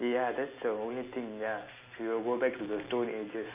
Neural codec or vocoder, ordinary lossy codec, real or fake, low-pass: none; Opus, 64 kbps; real; 3.6 kHz